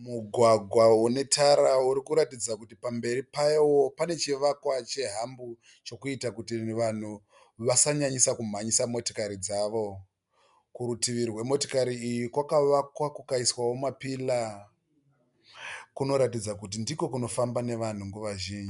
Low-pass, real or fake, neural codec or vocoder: 10.8 kHz; real; none